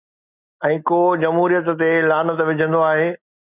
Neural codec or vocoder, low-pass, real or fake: none; 3.6 kHz; real